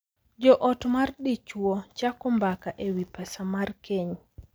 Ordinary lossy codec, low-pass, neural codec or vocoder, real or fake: none; none; none; real